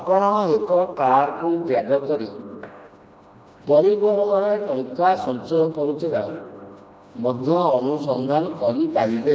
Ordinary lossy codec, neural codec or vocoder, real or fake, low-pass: none; codec, 16 kHz, 1 kbps, FreqCodec, smaller model; fake; none